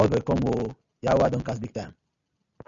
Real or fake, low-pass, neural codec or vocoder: real; 7.2 kHz; none